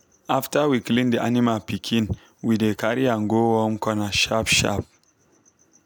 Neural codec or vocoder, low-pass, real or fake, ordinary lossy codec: none; none; real; none